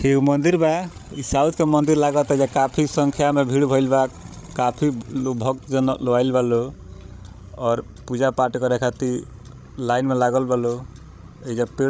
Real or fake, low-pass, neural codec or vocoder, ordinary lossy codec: fake; none; codec, 16 kHz, 16 kbps, FreqCodec, larger model; none